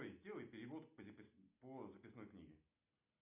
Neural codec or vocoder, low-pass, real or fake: none; 3.6 kHz; real